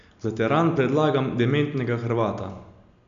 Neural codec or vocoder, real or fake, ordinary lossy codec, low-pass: none; real; MP3, 96 kbps; 7.2 kHz